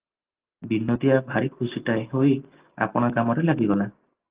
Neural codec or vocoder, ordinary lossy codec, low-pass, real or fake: none; Opus, 32 kbps; 3.6 kHz; real